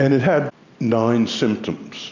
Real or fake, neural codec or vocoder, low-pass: real; none; 7.2 kHz